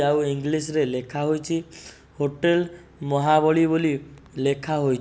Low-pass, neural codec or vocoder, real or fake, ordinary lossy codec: none; none; real; none